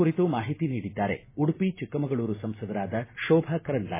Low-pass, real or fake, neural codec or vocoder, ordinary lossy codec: 3.6 kHz; real; none; MP3, 16 kbps